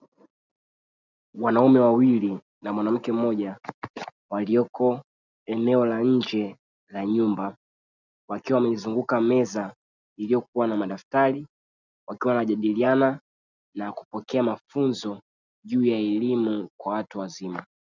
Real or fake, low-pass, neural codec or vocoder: real; 7.2 kHz; none